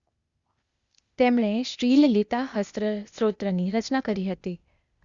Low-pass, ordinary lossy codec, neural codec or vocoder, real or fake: 7.2 kHz; Opus, 64 kbps; codec, 16 kHz, 0.8 kbps, ZipCodec; fake